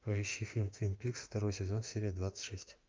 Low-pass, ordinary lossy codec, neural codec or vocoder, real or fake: 7.2 kHz; Opus, 24 kbps; autoencoder, 48 kHz, 32 numbers a frame, DAC-VAE, trained on Japanese speech; fake